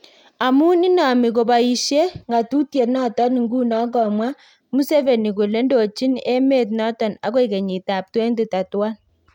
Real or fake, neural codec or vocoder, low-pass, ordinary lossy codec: real; none; 19.8 kHz; none